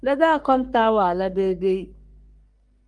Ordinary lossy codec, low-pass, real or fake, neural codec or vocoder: Opus, 32 kbps; 10.8 kHz; fake; codec, 44.1 kHz, 3.4 kbps, Pupu-Codec